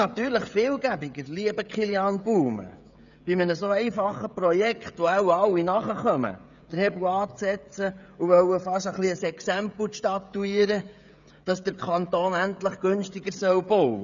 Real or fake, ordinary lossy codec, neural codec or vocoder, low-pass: fake; none; codec, 16 kHz, 16 kbps, FreqCodec, smaller model; 7.2 kHz